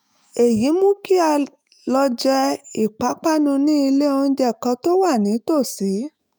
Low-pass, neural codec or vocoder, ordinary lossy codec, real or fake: none; autoencoder, 48 kHz, 128 numbers a frame, DAC-VAE, trained on Japanese speech; none; fake